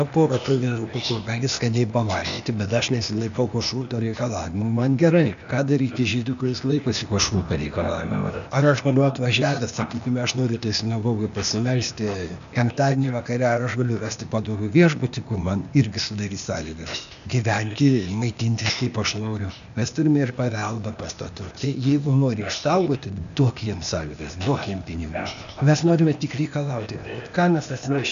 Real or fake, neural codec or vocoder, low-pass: fake; codec, 16 kHz, 0.8 kbps, ZipCodec; 7.2 kHz